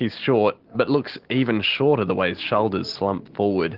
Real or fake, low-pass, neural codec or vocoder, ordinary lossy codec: real; 5.4 kHz; none; Opus, 16 kbps